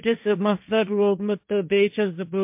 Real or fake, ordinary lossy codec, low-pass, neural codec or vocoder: fake; MP3, 32 kbps; 3.6 kHz; codec, 16 kHz, 1.1 kbps, Voila-Tokenizer